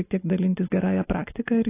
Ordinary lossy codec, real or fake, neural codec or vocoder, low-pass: AAC, 16 kbps; real; none; 3.6 kHz